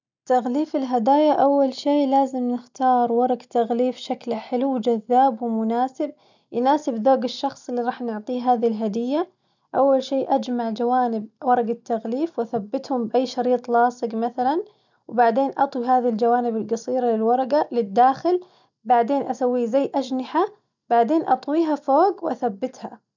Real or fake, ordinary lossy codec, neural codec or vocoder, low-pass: real; none; none; 7.2 kHz